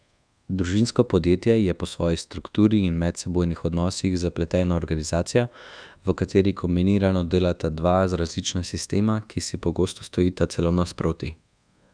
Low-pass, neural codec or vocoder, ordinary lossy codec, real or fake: 9.9 kHz; codec, 24 kHz, 1.2 kbps, DualCodec; none; fake